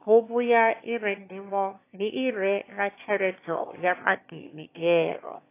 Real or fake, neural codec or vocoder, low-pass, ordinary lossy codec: fake; autoencoder, 22.05 kHz, a latent of 192 numbers a frame, VITS, trained on one speaker; 3.6 kHz; AAC, 24 kbps